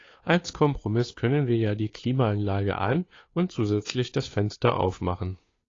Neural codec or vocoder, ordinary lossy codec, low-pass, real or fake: codec, 16 kHz, 2 kbps, FunCodec, trained on Chinese and English, 25 frames a second; AAC, 32 kbps; 7.2 kHz; fake